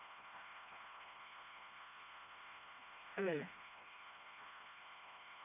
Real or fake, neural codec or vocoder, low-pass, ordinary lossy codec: fake; codec, 16 kHz, 1 kbps, FreqCodec, smaller model; 3.6 kHz; none